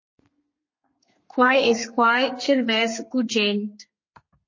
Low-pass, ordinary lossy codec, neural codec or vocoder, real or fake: 7.2 kHz; MP3, 32 kbps; codec, 32 kHz, 1.9 kbps, SNAC; fake